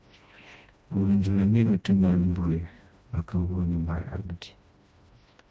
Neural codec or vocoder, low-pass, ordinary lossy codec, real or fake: codec, 16 kHz, 1 kbps, FreqCodec, smaller model; none; none; fake